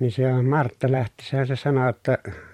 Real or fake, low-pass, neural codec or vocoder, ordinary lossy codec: fake; 19.8 kHz; vocoder, 44.1 kHz, 128 mel bands every 512 samples, BigVGAN v2; MP3, 64 kbps